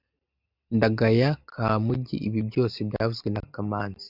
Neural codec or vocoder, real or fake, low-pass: none; real; 5.4 kHz